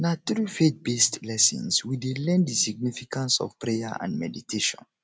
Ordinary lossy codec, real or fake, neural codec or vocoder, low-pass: none; real; none; none